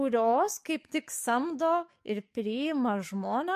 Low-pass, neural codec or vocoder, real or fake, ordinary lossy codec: 14.4 kHz; codec, 44.1 kHz, 7.8 kbps, DAC; fake; MP3, 64 kbps